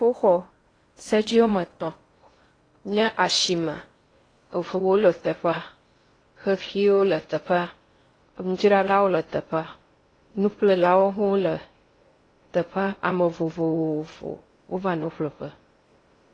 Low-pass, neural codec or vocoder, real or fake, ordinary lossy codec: 9.9 kHz; codec, 16 kHz in and 24 kHz out, 0.6 kbps, FocalCodec, streaming, 2048 codes; fake; AAC, 32 kbps